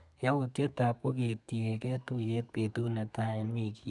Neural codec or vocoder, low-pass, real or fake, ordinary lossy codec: codec, 32 kHz, 1.9 kbps, SNAC; 10.8 kHz; fake; none